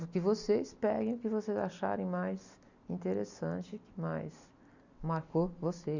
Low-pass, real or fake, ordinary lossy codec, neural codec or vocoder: 7.2 kHz; real; none; none